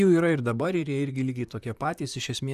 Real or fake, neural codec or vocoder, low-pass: fake; vocoder, 44.1 kHz, 128 mel bands, Pupu-Vocoder; 14.4 kHz